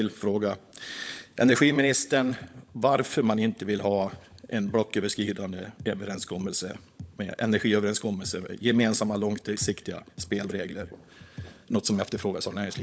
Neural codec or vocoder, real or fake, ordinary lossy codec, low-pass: codec, 16 kHz, 16 kbps, FunCodec, trained on LibriTTS, 50 frames a second; fake; none; none